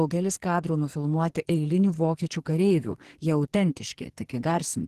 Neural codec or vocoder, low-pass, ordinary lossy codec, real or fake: codec, 44.1 kHz, 2.6 kbps, SNAC; 14.4 kHz; Opus, 16 kbps; fake